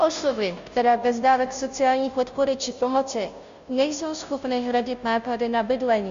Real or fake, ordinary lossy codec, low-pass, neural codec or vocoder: fake; Opus, 64 kbps; 7.2 kHz; codec, 16 kHz, 0.5 kbps, FunCodec, trained on Chinese and English, 25 frames a second